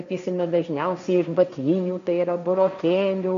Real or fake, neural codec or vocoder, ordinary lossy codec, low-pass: fake; codec, 16 kHz, 1.1 kbps, Voila-Tokenizer; AAC, 96 kbps; 7.2 kHz